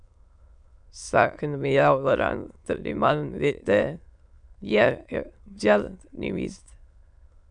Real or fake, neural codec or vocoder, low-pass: fake; autoencoder, 22.05 kHz, a latent of 192 numbers a frame, VITS, trained on many speakers; 9.9 kHz